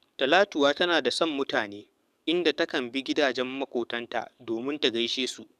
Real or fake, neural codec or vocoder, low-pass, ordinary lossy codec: fake; codec, 44.1 kHz, 7.8 kbps, DAC; 14.4 kHz; none